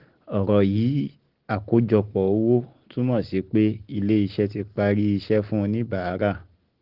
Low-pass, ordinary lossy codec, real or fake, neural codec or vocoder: 5.4 kHz; Opus, 16 kbps; real; none